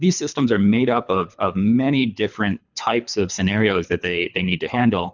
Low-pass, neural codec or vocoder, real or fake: 7.2 kHz; codec, 24 kHz, 3 kbps, HILCodec; fake